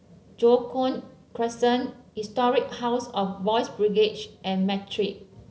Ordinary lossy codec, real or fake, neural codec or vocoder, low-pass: none; real; none; none